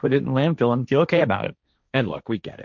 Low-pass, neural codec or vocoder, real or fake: 7.2 kHz; codec, 16 kHz, 1.1 kbps, Voila-Tokenizer; fake